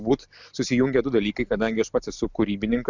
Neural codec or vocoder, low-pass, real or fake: none; 7.2 kHz; real